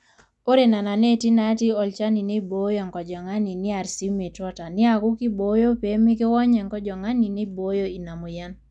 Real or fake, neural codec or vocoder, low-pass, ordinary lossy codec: real; none; none; none